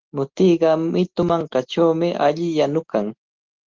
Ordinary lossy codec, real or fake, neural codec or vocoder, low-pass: Opus, 16 kbps; real; none; 7.2 kHz